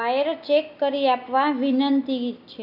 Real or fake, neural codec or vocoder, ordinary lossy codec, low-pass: real; none; none; 5.4 kHz